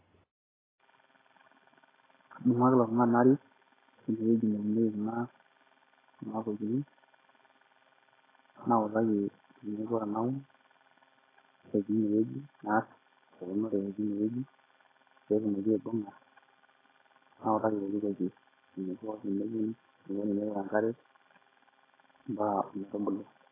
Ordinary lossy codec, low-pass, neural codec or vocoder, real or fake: AAC, 16 kbps; 3.6 kHz; none; real